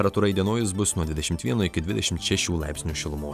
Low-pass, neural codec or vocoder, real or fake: 14.4 kHz; none; real